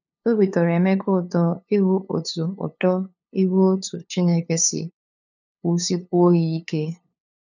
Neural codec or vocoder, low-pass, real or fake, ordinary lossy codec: codec, 16 kHz, 2 kbps, FunCodec, trained on LibriTTS, 25 frames a second; 7.2 kHz; fake; none